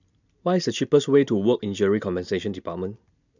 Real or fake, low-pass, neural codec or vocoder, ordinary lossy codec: real; 7.2 kHz; none; none